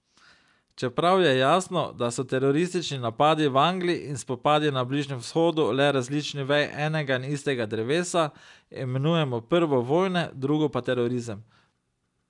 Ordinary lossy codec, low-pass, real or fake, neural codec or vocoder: none; 10.8 kHz; real; none